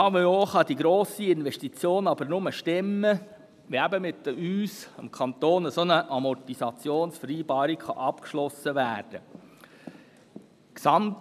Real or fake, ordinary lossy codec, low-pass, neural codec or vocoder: real; none; 14.4 kHz; none